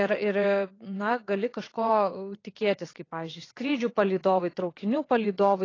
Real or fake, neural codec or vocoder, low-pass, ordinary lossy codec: fake; vocoder, 22.05 kHz, 80 mel bands, WaveNeXt; 7.2 kHz; AAC, 32 kbps